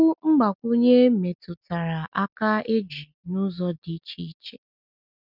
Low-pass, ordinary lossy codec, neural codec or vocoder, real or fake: 5.4 kHz; none; none; real